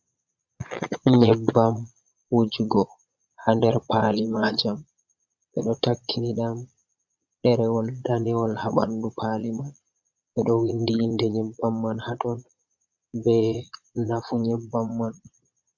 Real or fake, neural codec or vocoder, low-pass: fake; vocoder, 22.05 kHz, 80 mel bands, Vocos; 7.2 kHz